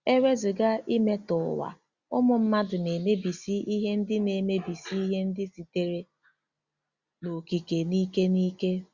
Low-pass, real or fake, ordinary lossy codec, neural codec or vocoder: 7.2 kHz; real; Opus, 64 kbps; none